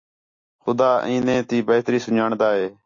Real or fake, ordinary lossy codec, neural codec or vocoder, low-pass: real; AAC, 48 kbps; none; 7.2 kHz